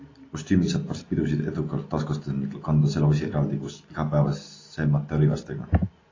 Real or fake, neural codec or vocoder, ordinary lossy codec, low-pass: real; none; AAC, 32 kbps; 7.2 kHz